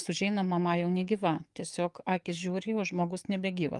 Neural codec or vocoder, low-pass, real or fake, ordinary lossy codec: codec, 44.1 kHz, 7.8 kbps, DAC; 10.8 kHz; fake; Opus, 32 kbps